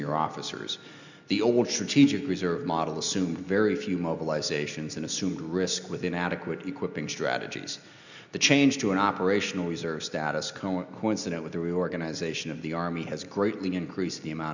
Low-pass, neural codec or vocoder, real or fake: 7.2 kHz; none; real